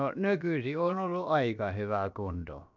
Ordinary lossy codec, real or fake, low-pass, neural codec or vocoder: none; fake; 7.2 kHz; codec, 16 kHz, about 1 kbps, DyCAST, with the encoder's durations